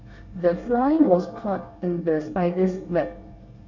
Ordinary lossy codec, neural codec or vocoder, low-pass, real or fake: none; codec, 24 kHz, 1 kbps, SNAC; 7.2 kHz; fake